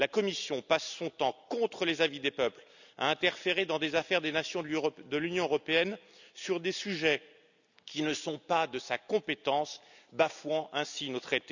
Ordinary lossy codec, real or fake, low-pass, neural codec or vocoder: none; real; 7.2 kHz; none